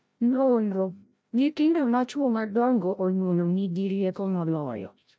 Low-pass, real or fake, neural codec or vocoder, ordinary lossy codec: none; fake; codec, 16 kHz, 0.5 kbps, FreqCodec, larger model; none